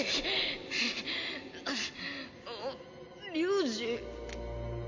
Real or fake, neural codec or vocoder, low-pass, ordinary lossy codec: real; none; 7.2 kHz; none